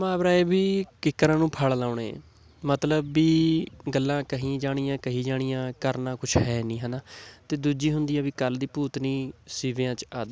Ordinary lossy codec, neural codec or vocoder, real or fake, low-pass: none; none; real; none